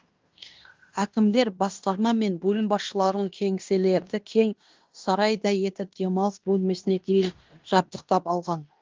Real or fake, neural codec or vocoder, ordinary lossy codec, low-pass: fake; codec, 16 kHz in and 24 kHz out, 0.9 kbps, LongCat-Audio-Codec, fine tuned four codebook decoder; Opus, 32 kbps; 7.2 kHz